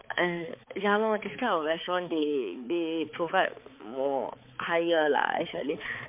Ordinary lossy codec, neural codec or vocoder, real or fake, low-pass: MP3, 32 kbps; codec, 16 kHz, 4 kbps, X-Codec, HuBERT features, trained on balanced general audio; fake; 3.6 kHz